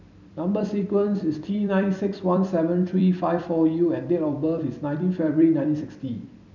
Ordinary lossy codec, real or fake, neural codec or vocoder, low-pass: none; fake; vocoder, 44.1 kHz, 128 mel bands every 256 samples, BigVGAN v2; 7.2 kHz